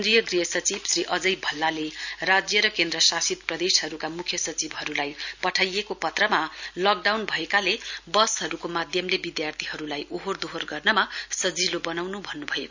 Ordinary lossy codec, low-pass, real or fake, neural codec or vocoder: none; 7.2 kHz; real; none